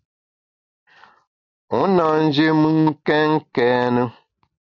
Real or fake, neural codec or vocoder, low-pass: real; none; 7.2 kHz